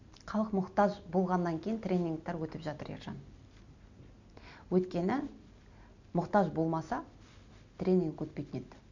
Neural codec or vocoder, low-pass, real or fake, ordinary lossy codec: none; 7.2 kHz; real; none